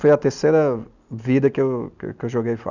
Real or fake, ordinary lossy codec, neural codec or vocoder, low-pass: real; none; none; 7.2 kHz